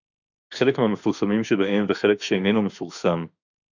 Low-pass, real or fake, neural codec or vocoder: 7.2 kHz; fake; autoencoder, 48 kHz, 32 numbers a frame, DAC-VAE, trained on Japanese speech